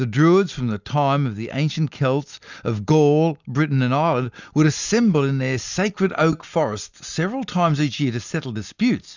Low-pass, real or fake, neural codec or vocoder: 7.2 kHz; real; none